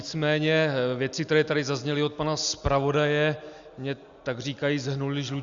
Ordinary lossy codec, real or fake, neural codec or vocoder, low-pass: Opus, 64 kbps; real; none; 7.2 kHz